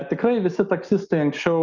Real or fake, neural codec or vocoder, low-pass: real; none; 7.2 kHz